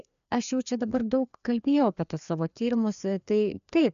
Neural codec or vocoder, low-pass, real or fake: codec, 16 kHz, 2 kbps, FreqCodec, larger model; 7.2 kHz; fake